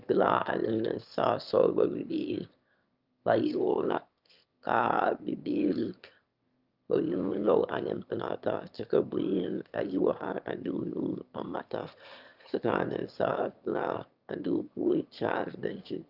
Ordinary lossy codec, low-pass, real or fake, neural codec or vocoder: Opus, 24 kbps; 5.4 kHz; fake; autoencoder, 22.05 kHz, a latent of 192 numbers a frame, VITS, trained on one speaker